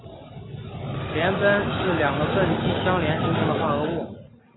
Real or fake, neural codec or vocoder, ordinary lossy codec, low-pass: real; none; AAC, 16 kbps; 7.2 kHz